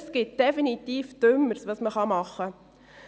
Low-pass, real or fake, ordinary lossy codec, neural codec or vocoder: none; real; none; none